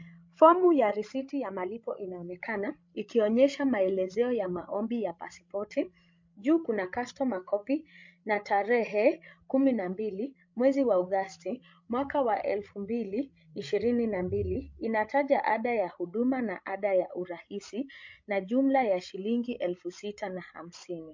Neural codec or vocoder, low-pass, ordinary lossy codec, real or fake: codec, 16 kHz, 16 kbps, FreqCodec, larger model; 7.2 kHz; MP3, 48 kbps; fake